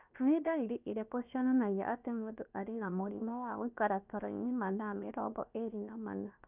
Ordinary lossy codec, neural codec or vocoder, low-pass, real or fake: none; codec, 16 kHz, 0.7 kbps, FocalCodec; 3.6 kHz; fake